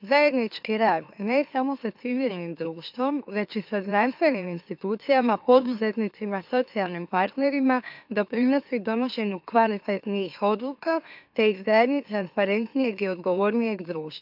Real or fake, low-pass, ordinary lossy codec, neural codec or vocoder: fake; 5.4 kHz; AAC, 48 kbps; autoencoder, 44.1 kHz, a latent of 192 numbers a frame, MeloTTS